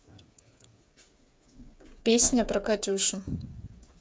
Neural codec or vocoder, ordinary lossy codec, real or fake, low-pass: codec, 16 kHz, 8 kbps, FreqCodec, smaller model; none; fake; none